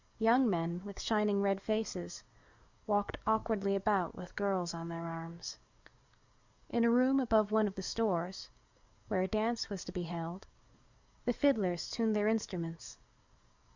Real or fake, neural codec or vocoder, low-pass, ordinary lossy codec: fake; codec, 44.1 kHz, 7.8 kbps, DAC; 7.2 kHz; Opus, 64 kbps